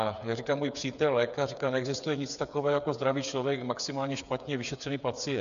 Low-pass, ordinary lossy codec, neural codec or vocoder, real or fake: 7.2 kHz; MP3, 96 kbps; codec, 16 kHz, 8 kbps, FreqCodec, smaller model; fake